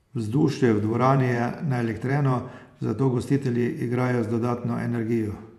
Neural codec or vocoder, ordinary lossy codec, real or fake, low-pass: vocoder, 48 kHz, 128 mel bands, Vocos; none; fake; 14.4 kHz